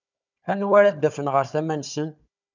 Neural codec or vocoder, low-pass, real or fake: codec, 16 kHz, 4 kbps, FunCodec, trained on Chinese and English, 50 frames a second; 7.2 kHz; fake